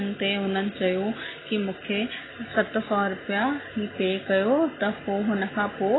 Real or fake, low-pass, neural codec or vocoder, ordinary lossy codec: real; 7.2 kHz; none; AAC, 16 kbps